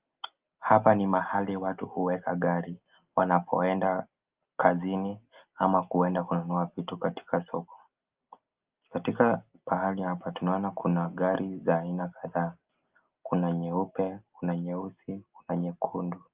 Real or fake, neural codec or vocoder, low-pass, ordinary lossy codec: real; none; 3.6 kHz; Opus, 32 kbps